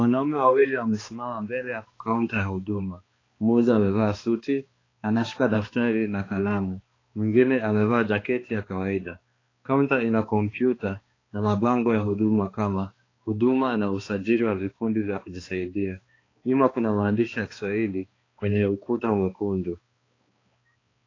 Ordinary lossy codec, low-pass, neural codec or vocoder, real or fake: AAC, 32 kbps; 7.2 kHz; codec, 16 kHz, 2 kbps, X-Codec, HuBERT features, trained on balanced general audio; fake